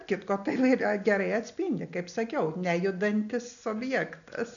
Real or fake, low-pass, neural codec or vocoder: real; 7.2 kHz; none